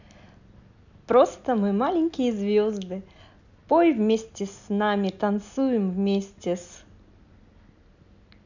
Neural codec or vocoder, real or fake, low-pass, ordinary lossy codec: none; real; 7.2 kHz; none